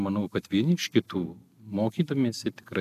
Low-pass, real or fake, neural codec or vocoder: 14.4 kHz; real; none